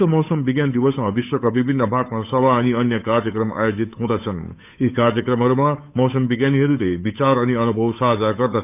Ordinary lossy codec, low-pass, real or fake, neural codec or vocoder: none; 3.6 kHz; fake; codec, 16 kHz, 8 kbps, FunCodec, trained on Chinese and English, 25 frames a second